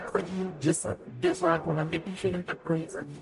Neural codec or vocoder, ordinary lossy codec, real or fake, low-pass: codec, 44.1 kHz, 0.9 kbps, DAC; MP3, 48 kbps; fake; 14.4 kHz